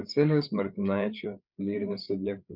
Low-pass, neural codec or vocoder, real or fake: 5.4 kHz; codec, 16 kHz, 8 kbps, FreqCodec, larger model; fake